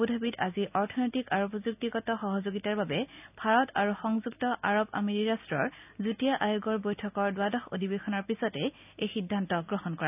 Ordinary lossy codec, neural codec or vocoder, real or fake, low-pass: none; none; real; 3.6 kHz